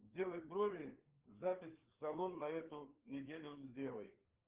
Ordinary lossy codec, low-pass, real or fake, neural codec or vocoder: Opus, 16 kbps; 3.6 kHz; fake; codec, 16 kHz, 4 kbps, FreqCodec, larger model